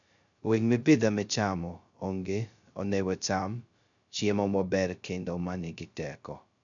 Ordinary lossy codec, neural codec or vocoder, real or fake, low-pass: none; codec, 16 kHz, 0.2 kbps, FocalCodec; fake; 7.2 kHz